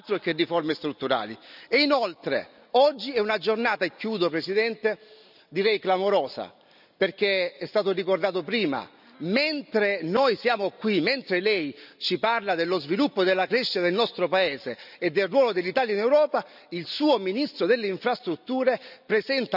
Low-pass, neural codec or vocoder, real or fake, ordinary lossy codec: 5.4 kHz; none; real; none